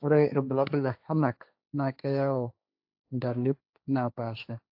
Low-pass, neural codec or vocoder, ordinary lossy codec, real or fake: 5.4 kHz; codec, 16 kHz, 1.1 kbps, Voila-Tokenizer; AAC, 48 kbps; fake